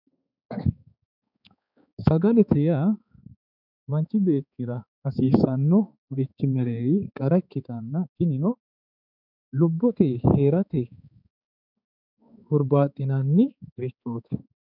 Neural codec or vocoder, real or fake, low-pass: codec, 16 kHz, 4 kbps, X-Codec, HuBERT features, trained on balanced general audio; fake; 5.4 kHz